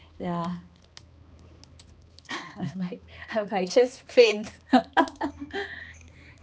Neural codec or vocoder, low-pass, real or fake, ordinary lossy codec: codec, 16 kHz, 2 kbps, X-Codec, HuBERT features, trained on balanced general audio; none; fake; none